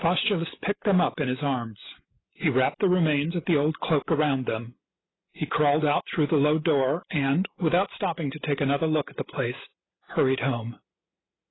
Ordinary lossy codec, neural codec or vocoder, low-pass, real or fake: AAC, 16 kbps; none; 7.2 kHz; real